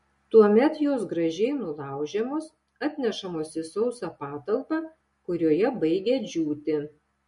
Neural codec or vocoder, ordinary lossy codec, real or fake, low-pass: none; MP3, 48 kbps; real; 10.8 kHz